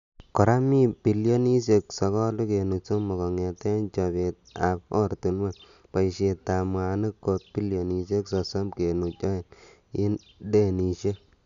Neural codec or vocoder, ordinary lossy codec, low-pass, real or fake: none; none; 7.2 kHz; real